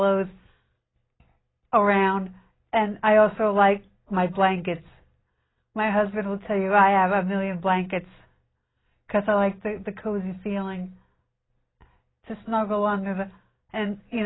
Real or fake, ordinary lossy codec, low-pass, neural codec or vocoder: real; AAC, 16 kbps; 7.2 kHz; none